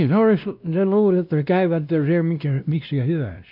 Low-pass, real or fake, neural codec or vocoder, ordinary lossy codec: 5.4 kHz; fake; codec, 16 kHz, 1 kbps, X-Codec, WavLM features, trained on Multilingual LibriSpeech; none